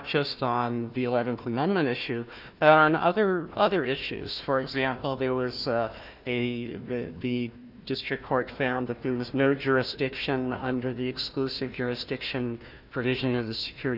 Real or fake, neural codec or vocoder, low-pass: fake; codec, 16 kHz, 1 kbps, FunCodec, trained on Chinese and English, 50 frames a second; 5.4 kHz